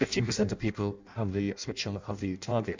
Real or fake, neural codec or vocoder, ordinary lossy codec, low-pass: fake; codec, 16 kHz in and 24 kHz out, 0.6 kbps, FireRedTTS-2 codec; MP3, 64 kbps; 7.2 kHz